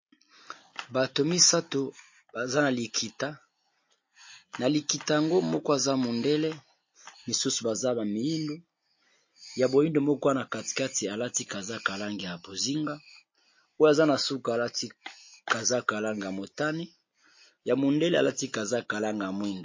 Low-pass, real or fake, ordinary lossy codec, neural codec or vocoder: 7.2 kHz; real; MP3, 32 kbps; none